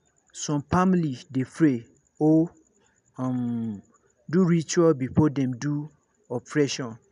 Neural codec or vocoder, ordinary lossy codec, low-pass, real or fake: none; none; 10.8 kHz; real